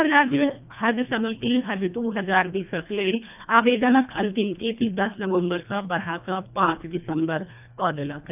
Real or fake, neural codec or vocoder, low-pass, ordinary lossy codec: fake; codec, 24 kHz, 1.5 kbps, HILCodec; 3.6 kHz; none